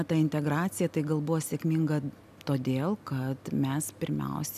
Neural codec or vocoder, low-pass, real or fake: none; 14.4 kHz; real